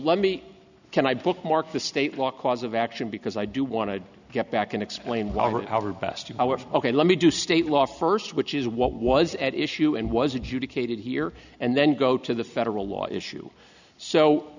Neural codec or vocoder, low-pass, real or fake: none; 7.2 kHz; real